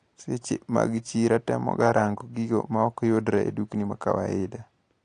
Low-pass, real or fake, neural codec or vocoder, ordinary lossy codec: 9.9 kHz; real; none; AAC, 48 kbps